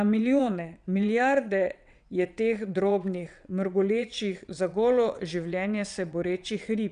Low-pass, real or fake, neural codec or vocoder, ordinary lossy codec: 9.9 kHz; fake; vocoder, 22.05 kHz, 80 mel bands, WaveNeXt; none